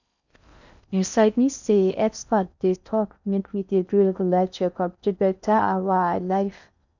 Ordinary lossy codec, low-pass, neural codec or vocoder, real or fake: none; 7.2 kHz; codec, 16 kHz in and 24 kHz out, 0.6 kbps, FocalCodec, streaming, 4096 codes; fake